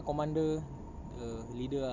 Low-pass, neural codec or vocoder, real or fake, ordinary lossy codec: 7.2 kHz; none; real; none